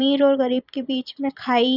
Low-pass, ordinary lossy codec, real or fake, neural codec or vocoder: 5.4 kHz; none; fake; vocoder, 44.1 kHz, 128 mel bands every 256 samples, BigVGAN v2